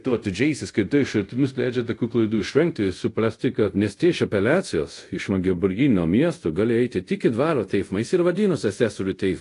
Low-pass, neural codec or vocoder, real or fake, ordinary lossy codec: 10.8 kHz; codec, 24 kHz, 0.5 kbps, DualCodec; fake; AAC, 48 kbps